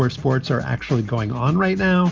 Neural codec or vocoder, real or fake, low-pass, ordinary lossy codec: none; real; 7.2 kHz; Opus, 24 kbps